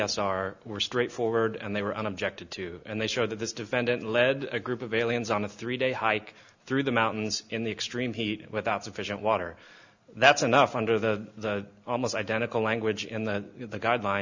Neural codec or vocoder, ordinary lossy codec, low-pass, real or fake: none; Opus, 64 kbps; 7.2 kHz; real